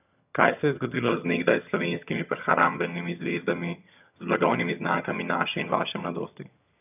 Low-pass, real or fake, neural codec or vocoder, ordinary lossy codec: 3.6 kHz; fake; vocoder, 22.05 kHz, 80 mel bands, HiFi-GAN; none